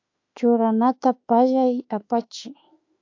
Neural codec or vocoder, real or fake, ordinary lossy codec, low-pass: autoencoder, 48 kHz, 32 numbers a frame, DAC-VAE, trained on Japanese speech; fake; AAC, 48 kbps; 7.2 kHz